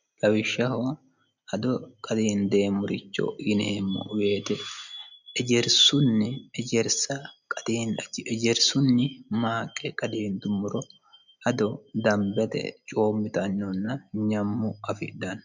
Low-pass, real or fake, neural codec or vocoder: 7.2 kHz; real; none